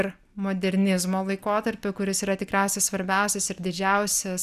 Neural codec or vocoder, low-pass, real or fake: none; 14.4 kHz; real